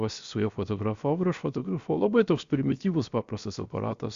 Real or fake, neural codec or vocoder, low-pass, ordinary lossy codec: fake; codec, 16 kHz, 0.7 kbps, FocalCodec; 7.2 kHz; Opus, 64 kbps